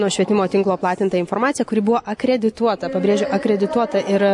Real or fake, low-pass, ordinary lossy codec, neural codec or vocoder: real; 10.8 kHz; MP3, 48 kbps; none